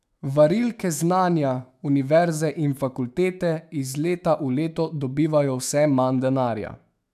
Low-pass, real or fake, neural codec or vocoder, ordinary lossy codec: 14.4 kHz; fake; autoencoder, 48 kHz, 128 numbers a frame, DAC-VAE, trained on Japanese speech; none